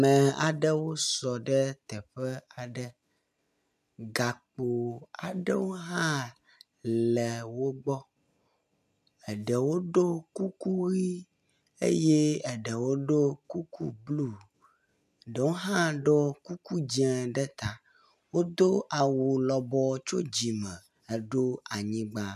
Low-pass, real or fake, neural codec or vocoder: 14.4 kHz; real; none